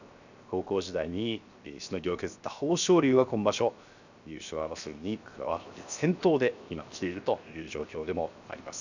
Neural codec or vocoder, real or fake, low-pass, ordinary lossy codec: codec, 16 kHz, 0.7 kbps, FocalCodec; fake; 7.2 kHz; none